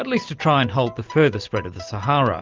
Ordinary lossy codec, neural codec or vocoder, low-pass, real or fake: Opus, 24 kbps; none; 7.2 kHz; real